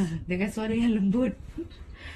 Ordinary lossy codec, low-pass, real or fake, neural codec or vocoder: AAC, 32 kbps; 19.8 kHz; fake; vocoder, 44.1 kHz, 128 mel bands, Pupu-Vocoder